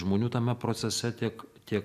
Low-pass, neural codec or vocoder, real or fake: 14.4 kHz; none; real